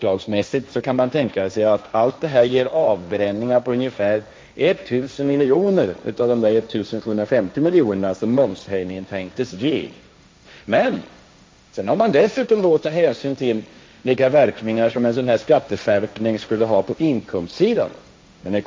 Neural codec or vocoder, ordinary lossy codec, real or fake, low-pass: codec, 16 kHz, 1.1 kbps, Voila-Tokenizer; none; fake; none